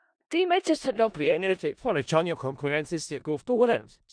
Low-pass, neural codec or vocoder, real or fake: 9.9 kHz; codec, 16 kHz in and 24 kHz out, 0.4 kbps, LongCat-Audio-Codec, four codebook decoder; fake